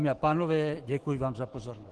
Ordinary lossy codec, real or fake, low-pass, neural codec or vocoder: Opus, 16 kbps; fake; 10.8 kHz; autoencoder, 48 kHz, 128 numbers a frame, DAC-VAE, trained on Japanese speech